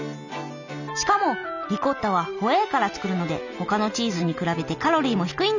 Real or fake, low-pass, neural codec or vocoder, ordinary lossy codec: real; 7.2 kHz; none; none